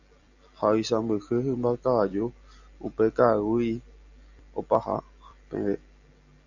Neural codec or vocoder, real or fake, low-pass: none; real; 7.2 kHz